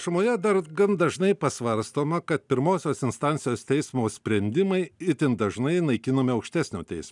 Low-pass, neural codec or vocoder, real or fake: 10.8 kHz; none; real